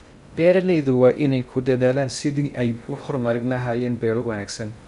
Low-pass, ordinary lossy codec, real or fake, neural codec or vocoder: 10.8 kHz; none; fake; codec, 16 kHz in and 24 kHz out, 0.6 kbps, FocalCodec, streaming, 2048 codes